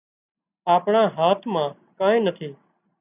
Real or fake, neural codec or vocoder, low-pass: real; none; 3.6 kHz